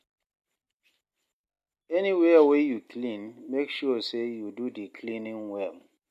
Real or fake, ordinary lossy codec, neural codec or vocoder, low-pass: real; MP3, 64 kbps; none; 14.4 kHz